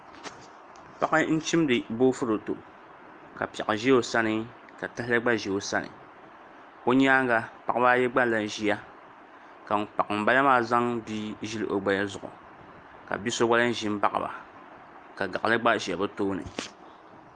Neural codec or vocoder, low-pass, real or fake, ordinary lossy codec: none; 9.9 kHz; real; Opus, 32 kbps